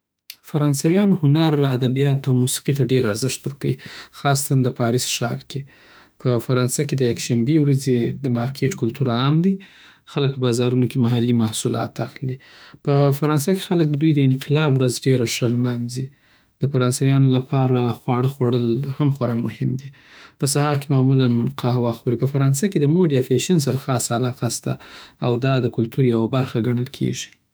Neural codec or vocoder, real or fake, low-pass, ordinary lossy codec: autoencoder, 48 kHz, 32 numbers a frame, DAC-VAE, trained on Japanese speech; fake; none; none